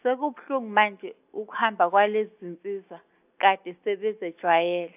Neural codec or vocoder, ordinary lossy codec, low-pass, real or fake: none; AAC, 32 kbps; 3.6 kHz; real